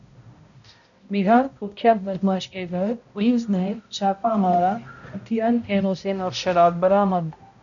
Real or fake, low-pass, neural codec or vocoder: fake; 7.2 kHz; codec, 16 kHz, 0.5 kbps, X-Codec, HuBERT features, trained on balanced general audio